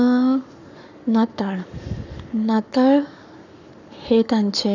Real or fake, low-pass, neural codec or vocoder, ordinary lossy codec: fake; 7.2 kHz; codec, 44.1 kHz, 7.8 kbps, Pupu-Codec; none